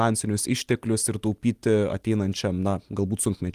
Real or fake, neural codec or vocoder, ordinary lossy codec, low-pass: real; none; Opus, 24 kbps; 14.4 kHz